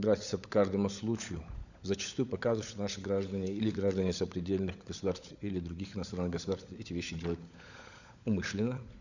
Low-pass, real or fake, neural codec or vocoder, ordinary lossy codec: 7.2 kHz; fake; codec, 16 kHz, 16 kbps, FunCodec, trained on LibriTTS, 50 frames a second; none